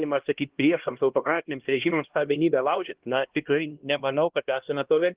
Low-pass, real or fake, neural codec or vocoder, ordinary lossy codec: 3.6 kHz; fake; codec, 16 kHz, 1 kbps, X-Codec, HuBERT features, trained on LibriSpeech; Opus, 16 kbps